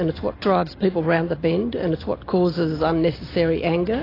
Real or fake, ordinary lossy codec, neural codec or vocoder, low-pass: real; AAC, 24 kbps; none; 5.4 kHz